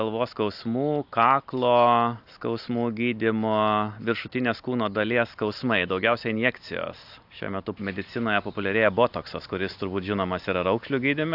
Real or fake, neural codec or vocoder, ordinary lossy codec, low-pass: real; none; AAC, 48 kbps; 5.4 kHz